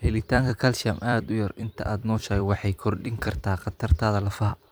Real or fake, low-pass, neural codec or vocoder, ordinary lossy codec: fake; none; vocoder, 44.1 kHz, 128 mel bands every 256 samples, BigVGAN v2; none